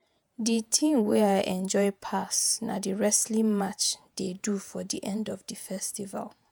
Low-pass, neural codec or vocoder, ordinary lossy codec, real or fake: none; vocoder, 48 kHz, 128 mel bands, Vocos; none; fake